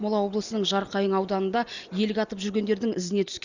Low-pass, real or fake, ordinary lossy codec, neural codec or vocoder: 7.2 kHz; real; Opus, 64 kbps; none